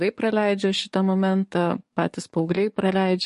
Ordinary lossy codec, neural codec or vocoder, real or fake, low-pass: MP3, 48 kbps; codec, 44.1 kHz, 7.8 kbps, DAC; fake; 14.4 kHz